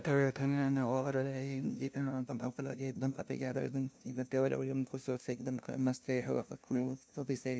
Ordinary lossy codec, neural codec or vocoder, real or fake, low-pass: none; codec, 16 kHz, 0.5 kbps, FunCodec, trained on LibriTTS, 25 frames a second; fake; none